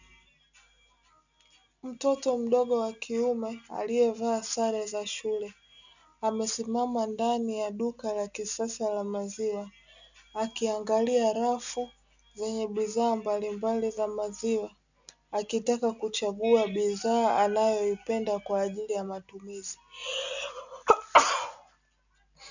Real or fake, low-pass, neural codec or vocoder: real; 7.2 kHz; none